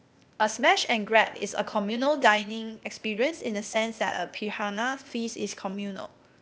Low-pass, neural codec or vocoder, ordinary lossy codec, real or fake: none; codec, 16 kHz, 0.8 kbps, ZipCodec; none; fake